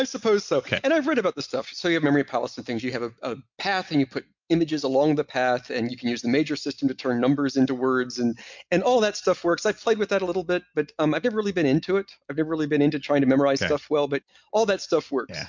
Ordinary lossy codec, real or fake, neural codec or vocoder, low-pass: MP3, 64 kbps; real; none; 7.2 kHz